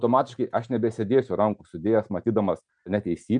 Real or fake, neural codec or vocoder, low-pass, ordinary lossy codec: real; none; 9.9 kHz; MP3, 96 kbps